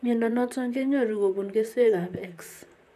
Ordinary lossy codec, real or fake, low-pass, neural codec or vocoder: none; fake; 14.4 kHz; vocoder, 44.1 kHz, 128 mel bands, Pupu-Vocoder